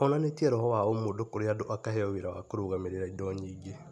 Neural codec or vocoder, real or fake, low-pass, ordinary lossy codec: none; real; none; none